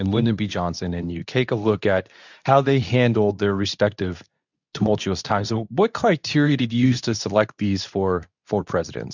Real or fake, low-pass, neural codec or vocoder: fake; 7.2 kHz; codec, 24 kHz, 0.9 kbps, WavTokenizer, medium speech release version 2